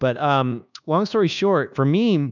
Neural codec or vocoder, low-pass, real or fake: codec, 24 kHz, 1.2 kbps, DualCodec; 7.2 kHz; fake